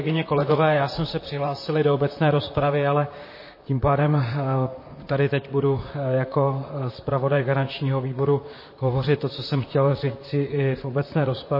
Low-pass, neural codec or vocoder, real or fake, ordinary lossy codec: 5.4 kHz; vocoder, 44.1 kHz, 128 mel bands, Pupu-Vocoder; fake; MP3, 24 kbps